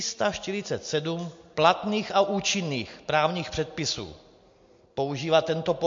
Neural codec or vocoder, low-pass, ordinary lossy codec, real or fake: none; 7.2 kHz; MP3, 48 kbps; real